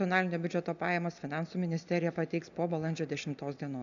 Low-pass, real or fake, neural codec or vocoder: 7.2 kHz; real; none